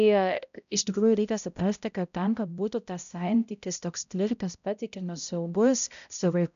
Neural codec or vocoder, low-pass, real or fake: codec, 16 kHz, 0.5 kbps, X-Codec, HuBERT features, trained on balanced general audio; 7.2 kHz; fake